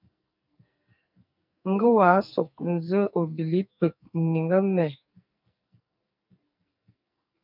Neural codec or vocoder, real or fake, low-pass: codec, 44.1 kHz, 2.6 kbps, SNAC; fake; 5.4 kHz